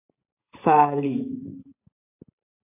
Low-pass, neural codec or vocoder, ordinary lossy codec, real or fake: 3.6 kHz; vocoder, 44.1 kHz, 128 mel bands every 512 samples, BigVGAN v2; AAC, 32 kbps; fake